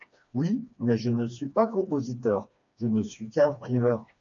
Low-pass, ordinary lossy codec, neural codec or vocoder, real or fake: 7.2 kHz; AAC, 64 kbps; codec, 16 kHz, 2 kbps, FreqCodec, smaller model; fake